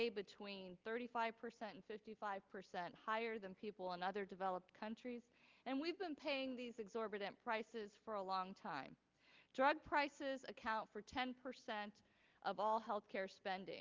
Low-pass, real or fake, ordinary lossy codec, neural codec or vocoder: 7.2 kHz; real; Opus, 32 kbps; none